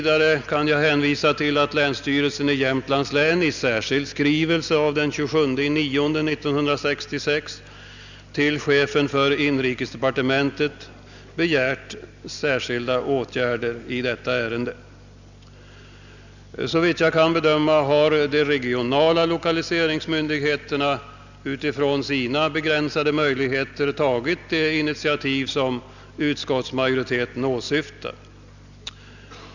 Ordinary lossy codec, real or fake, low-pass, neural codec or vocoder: none; real; 7.2 kHz; none